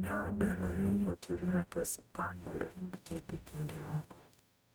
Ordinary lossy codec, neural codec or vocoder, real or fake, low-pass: none; codec, 44.1 kHz, 0.9 kbps, DAC; fake; none